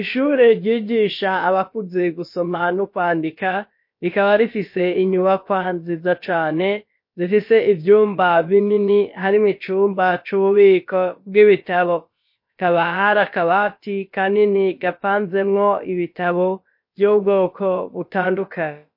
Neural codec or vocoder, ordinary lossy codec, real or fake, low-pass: codec, 16 kHz, about 1 kbps, DyCAST, with the encoder's durations; MP3, 32 kbps; fake; 5.4 kHz